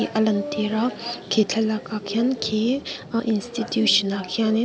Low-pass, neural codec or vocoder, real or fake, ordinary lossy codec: none; none; real; none